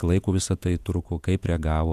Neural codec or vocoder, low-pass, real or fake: vocoder, 48 kHz, 128 mel bands, Vocos; 14.4 kHz; fake